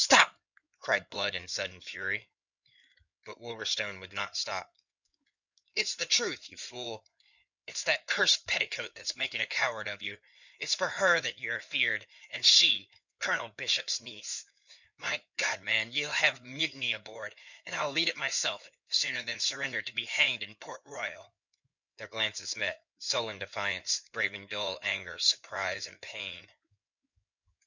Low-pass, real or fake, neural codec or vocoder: 7.2 kHz; fake; codec, 16 kHz in and 24 kHz out, 2.2 kbps, FireRedTTS-2 codec